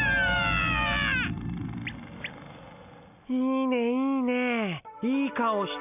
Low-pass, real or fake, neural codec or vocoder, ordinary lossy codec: 3.6 kHz; real; none; none